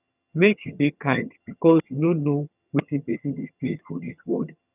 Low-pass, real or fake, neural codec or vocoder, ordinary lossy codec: 3.6 kHz; fake; vocoder, 22.05 kHz, 80 mel bands, HiFi-GAN; none